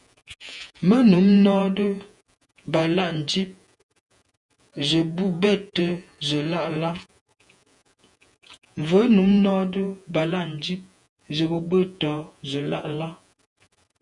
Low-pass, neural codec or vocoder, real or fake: 10.8 kHz; vocoder, 48 kHz, 128 mel bands, Vocos; fake